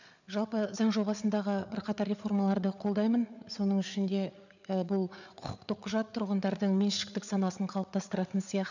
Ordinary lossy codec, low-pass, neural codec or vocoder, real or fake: none; 7.2 kHz; codec, 16 kHz, 8 kbps, FreqCodec, larger model; fake